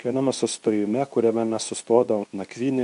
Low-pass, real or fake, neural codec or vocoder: 10.8 kHz; fake; codec, 24 kHz, 0.9 kbps, WavTokenizer, medium speech release version 2